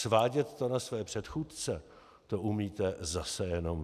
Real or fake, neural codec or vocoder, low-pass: real; none; 14.4 kHz